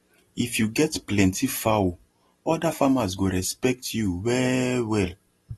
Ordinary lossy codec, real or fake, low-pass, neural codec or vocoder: AAC, 32 kbps; real; 19.8 kHz; none